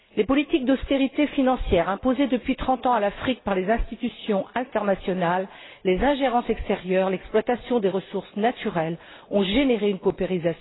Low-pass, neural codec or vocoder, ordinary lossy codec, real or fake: 7.2 kHz; none; AAC, 16 kbps; real